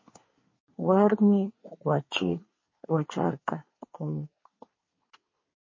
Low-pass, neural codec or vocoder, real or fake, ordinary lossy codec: 7.2 kHz; codec, 24 kHz, 1 kbps, SNAC; fake; MP3, 32 kbps